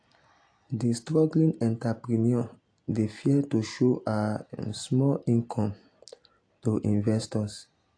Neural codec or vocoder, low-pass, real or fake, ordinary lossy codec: none; 9.9 kHz; real; AAC, 48 kbps